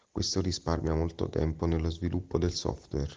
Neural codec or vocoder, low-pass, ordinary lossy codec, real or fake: none; 7.2 kHz; Opus, 16 kbps; real